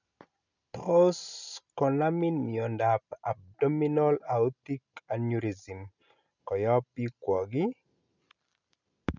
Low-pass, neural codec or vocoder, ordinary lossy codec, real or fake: 7.2 kHz; none; none; real